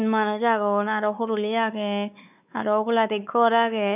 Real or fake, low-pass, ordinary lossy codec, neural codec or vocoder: fake; 3.6 kHz; none; codec, 16 kHz, 4 kbps, X-Codec, WavLM features, trained on Multilingual LibriSpeech